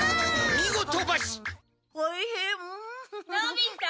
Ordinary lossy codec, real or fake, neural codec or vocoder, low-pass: none; real; none; none